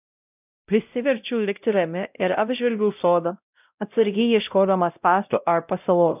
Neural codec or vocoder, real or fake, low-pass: codec, 16 kHz, 0.5 kbps, X-Codec, WavLM features, trained on Multilingual LibriSpeech; fake; 3.6 kHz